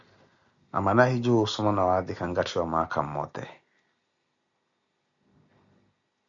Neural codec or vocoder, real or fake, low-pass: none; real; 7.2 kHz